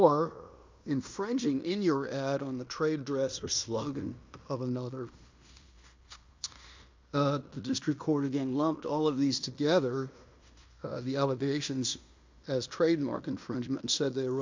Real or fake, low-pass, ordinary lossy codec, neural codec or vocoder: fake; 7.2 kHz; MP3, 64 kbps; codec, 16 kHz in and 24 kHz out, 0.9 kbps, LongCat-Audio-Codec, fine tuned four codebook decoder